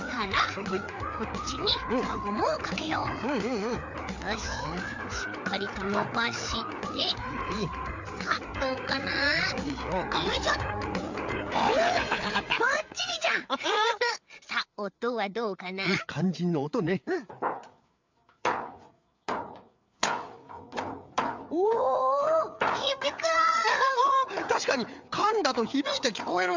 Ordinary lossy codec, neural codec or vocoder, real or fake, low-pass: MP3, 64 kbps; codec, 16 kHz, 4 kbps, FreqCodec, larger model; fake; 7.2 kHz